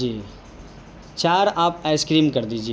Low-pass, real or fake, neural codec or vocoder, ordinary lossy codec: none; real; none; none